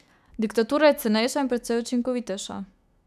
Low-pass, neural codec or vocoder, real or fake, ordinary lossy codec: 14.4 kHz; autoencoder, 48 kHz, 128 numbers a frame, DAC-VAE, trained on Japanese speech; fake; none